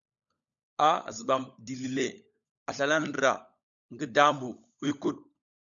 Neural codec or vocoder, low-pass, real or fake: codec, 16 kHz, 16 kbps, FunCodec, trained on LibriTTS, 50 frames a second; 7.2 kHz; fake